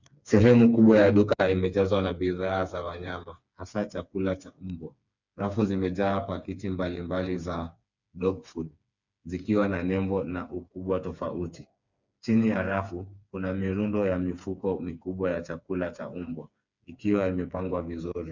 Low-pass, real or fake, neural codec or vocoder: 7.2 kHz; fake; codec, 16 kHz, 4 kbps, FreqCodec, smaller model